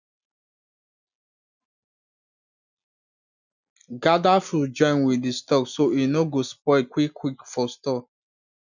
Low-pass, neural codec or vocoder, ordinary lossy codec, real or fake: 7.2 kHz; none; none; real